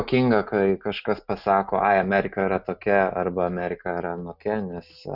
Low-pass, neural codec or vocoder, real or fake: 5.4 kHz; none; real